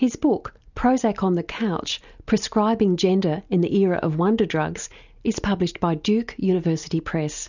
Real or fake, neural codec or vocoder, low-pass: real; none; 7.2 kHz